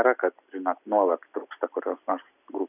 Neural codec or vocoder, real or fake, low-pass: none; real; 3.6 kHz